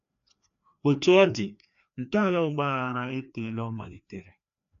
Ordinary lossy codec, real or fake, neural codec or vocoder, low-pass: none; fake; codec, 16 kHz, 2 kbps, FreqCodec, larger model; 7.2 kHz